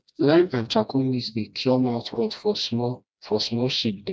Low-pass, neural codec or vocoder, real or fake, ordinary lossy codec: none; codec, 16 kHz, 1 kbps, FreqCodec, smaller model; fake; none